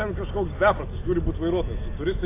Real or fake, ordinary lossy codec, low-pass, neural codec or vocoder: real; AAC, 24 kbps; 3.6 kHz; none